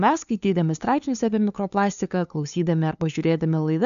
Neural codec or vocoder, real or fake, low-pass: codec, 16 kHz, 2 kbps, FunCodec, trained on LibriTTS, 25 frames a second; fake; 7.2 kHz